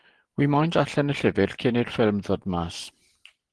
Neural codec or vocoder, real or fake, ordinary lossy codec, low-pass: none; real; Opus, 16 kbps; 10.8 kHz